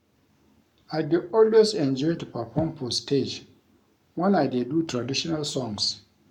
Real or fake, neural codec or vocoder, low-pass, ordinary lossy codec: fake; codec, 44.1 kHz, 7.8 kbps, Pupu-Codec; 19.8 kHz; none